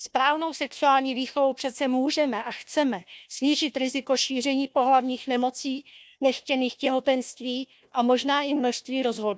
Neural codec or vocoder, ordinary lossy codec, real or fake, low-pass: codec, 16 kHz, 1 kbps, FunCodec, trained on Chinese and English, 50 frames a second; none; fake; none